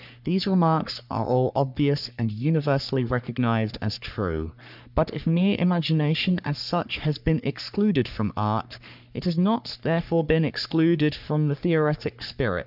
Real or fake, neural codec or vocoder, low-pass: fake; codec, 44.1 kHz, 3.4 kbps, Pupu-Codec; 5.4 kHz